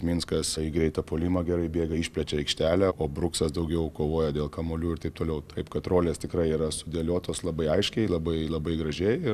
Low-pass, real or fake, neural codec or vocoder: 14.4 kHz; real; none